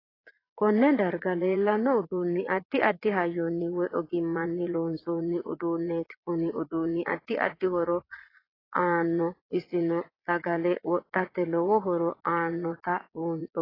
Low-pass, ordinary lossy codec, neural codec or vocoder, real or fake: 5.4 kHz; AAC, 24 kbps; vocoder, 22.05 kHz, 80 mel bands, Vocos; fake